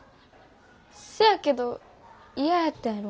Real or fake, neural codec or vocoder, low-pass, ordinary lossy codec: real; none; none; none